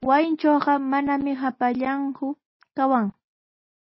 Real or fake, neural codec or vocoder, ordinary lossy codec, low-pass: real; none; MP3, 24 kbps; 7.2 kHz